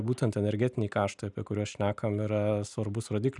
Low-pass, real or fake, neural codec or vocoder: 10.8 kHz; real; none